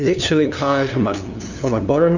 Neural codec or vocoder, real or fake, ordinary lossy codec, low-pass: codec, 16 kHz, 2 kbps, FunCodec, trained on LibriTTS, 25 frames a second; fake; Opus, 64 kbps; 7.2 kHz